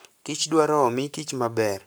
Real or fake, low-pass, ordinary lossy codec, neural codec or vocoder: fake; none; none; codec, 44.1 kHz, 7.8 kbps, Pupu-Codec